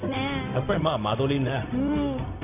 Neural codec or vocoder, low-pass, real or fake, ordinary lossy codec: codec, 16 kHz, 0.4 kbps, LongCat-Audio-Codec; 3.6 kHz; fake; none